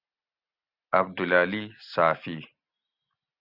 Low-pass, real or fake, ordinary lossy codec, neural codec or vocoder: 5.4 kHz; real; Opus, 64 kbps; none